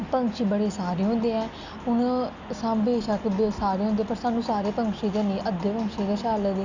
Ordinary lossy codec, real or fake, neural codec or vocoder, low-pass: none; real; none; 7.2 kHz